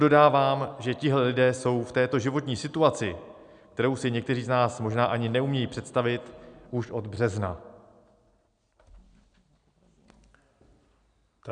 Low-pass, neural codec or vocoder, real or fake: 9.9 kHz; none; real